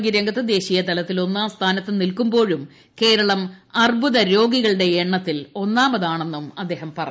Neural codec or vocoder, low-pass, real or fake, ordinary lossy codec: none; none; real; none